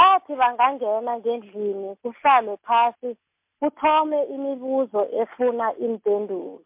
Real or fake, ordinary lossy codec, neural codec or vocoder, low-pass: real; MP3, 32 kbps; none; 3.6 kHz